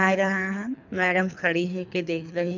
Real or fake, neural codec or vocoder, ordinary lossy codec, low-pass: fake; codec, 24 kHz, 3 kbps, HILCodec; none; 7.2 kHz